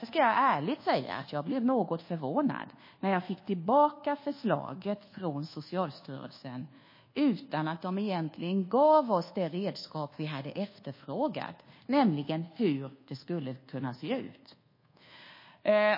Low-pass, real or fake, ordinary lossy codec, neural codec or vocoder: 5.4 kHz; fake; MP3, 24 kbps; codec, 24 kHz, 1.2 kbps, DualCodec